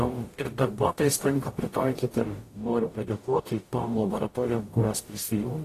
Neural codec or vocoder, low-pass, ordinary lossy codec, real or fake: codec, 44.1 kHz, 0.9 kbps, DAC; 14.4 kHz; AAC, 48 kbps; fake